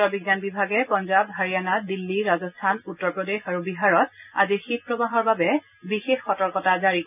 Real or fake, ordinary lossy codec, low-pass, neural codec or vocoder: real; none; 3.6 kHz; none